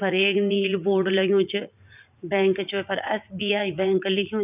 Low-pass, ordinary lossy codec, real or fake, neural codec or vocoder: 3.6 kHz; none; fake; vocoder, 44.1 kHz, 80 mel bands, Vocos